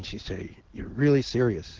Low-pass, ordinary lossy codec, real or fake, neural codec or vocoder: 7.2 kHz; Opus, 16 kbps; real; none